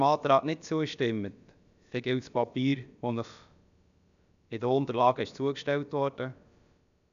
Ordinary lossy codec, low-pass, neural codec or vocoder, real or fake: none; 7.2 kHz; codec, 16 kHz, about 1 kbps, DyCAST, with the encoder's durations; fake